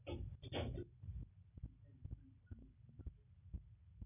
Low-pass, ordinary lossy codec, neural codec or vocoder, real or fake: 3.6 kHz; none; none; real